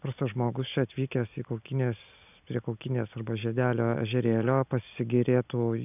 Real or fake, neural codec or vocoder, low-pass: real; none; 3.6 kHz